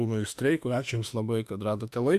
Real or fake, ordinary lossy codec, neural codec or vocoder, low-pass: fake; Opus, 64 kbps; autoencoder, 48 kHz, 32 numbers a frame, DAC-VAE, trained on Japanese speech; 14.4 kHz